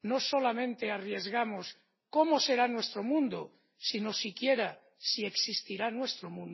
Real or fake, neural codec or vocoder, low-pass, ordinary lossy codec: real; none; 7.2 kHz; MP3, 24 kbps